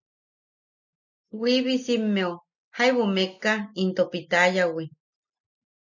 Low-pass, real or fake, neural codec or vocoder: 7.2 kHz; real; none